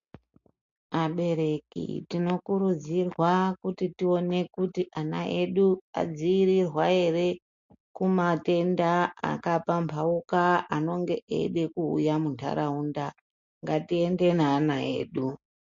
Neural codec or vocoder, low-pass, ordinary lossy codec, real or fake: none; 7.2 kHz; MP3, 48 kbps; real